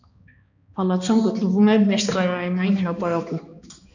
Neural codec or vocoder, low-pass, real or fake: codec, 16 kHz, 2 kbps, X-Codec, HuBERT features, trained on balanced general audio; 7.2 kHz; fake